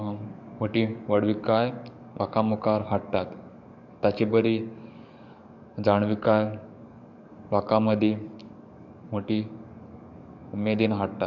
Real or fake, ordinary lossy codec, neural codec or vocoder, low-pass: fake; none; codec, 44.1 kHz, 7.8 kbps, DAC; 7.2 kHz